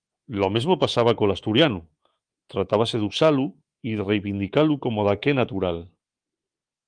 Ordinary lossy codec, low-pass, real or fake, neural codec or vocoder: Opus, 24 kbps; 9.9 kHz; fake; codec, 24 kHz, 3.1 kbps, DualCodec